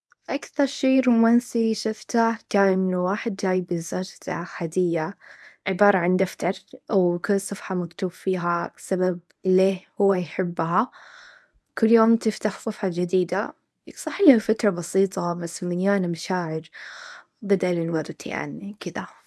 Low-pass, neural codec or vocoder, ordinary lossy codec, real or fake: none; codec, 24 kHz, 0.9 kbps, WavTokenizer, medium speech release version 1; none; fake